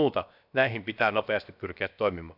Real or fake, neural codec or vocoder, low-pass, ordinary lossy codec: fake; codec, 16 kHz, about 1 kbps, DyCAST, with the encoder's durations; 5.4 kHz; none